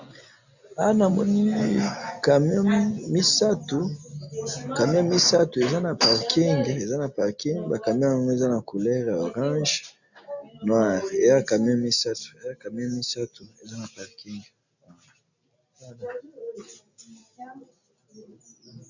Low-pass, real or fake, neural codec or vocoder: 7.2 kHz; real; none